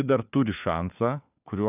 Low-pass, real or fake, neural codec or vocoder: 3.6 kHz; fake; codec, 16 kHz, 4 kbps, FunCodec, trained on LibriTTS, 50 frames a second